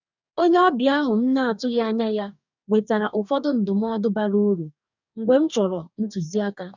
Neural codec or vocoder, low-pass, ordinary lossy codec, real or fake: codec, 44.1 kHz, 2.6 kbps, DAC; 7.2 kHz; none; fake